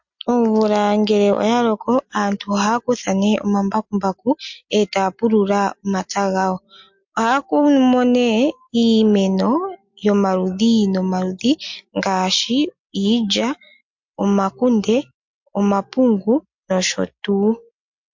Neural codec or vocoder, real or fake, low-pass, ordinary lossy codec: none; real; 7.2 kHz; MP3, 48 kbps